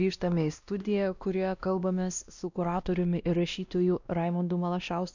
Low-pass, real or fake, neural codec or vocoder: 7.2 kHz; fake; codec, 16 kHz, 1 kbps, X-Codec, WavLM features, trained on Multilingual LibriSpeech